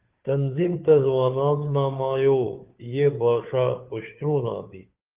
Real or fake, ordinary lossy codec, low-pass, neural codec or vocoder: fake; Opus, 32 kbps; 3.6 kHz; codec, 16 kHz, 2 kbps, FunCodec, trained on Chinese and English, 25 frames a second